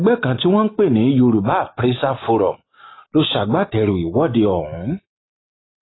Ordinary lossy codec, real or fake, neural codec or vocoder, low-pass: AAC, 16 kbps; real; none; 7.2 kHz